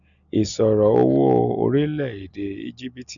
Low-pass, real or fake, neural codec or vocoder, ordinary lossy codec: 7.2 kHz; real; none; none